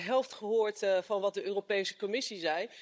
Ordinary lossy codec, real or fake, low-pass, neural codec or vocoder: none; fake; none; codec, 16 kHz, 16 kbps, FunCodec, trained on Chinese and English, 50 frames a second